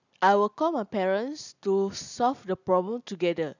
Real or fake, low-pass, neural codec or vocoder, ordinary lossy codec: real; 7.2 kHz; none; none